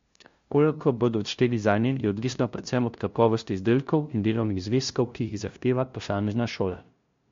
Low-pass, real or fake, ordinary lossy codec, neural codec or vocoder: 7.2 kHz; fake; MP3, 48 kbps; codec, 16 kHz, 0.5 kbps, FunCodec, trained on LibriTTS, 25 frames a second